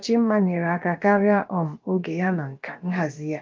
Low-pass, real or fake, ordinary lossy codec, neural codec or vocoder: 7.2 kHz; fake; Opus, 24 kbps; codec, 16 kHz, about 1 kbps, DyCAST, with the encoder's durations